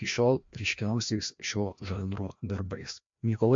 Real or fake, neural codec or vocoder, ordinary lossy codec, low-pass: fake; codec, 16 kHz, 1 kbps, FreqCodec, larger model; MP3, 48 kbps; 7.2 kHz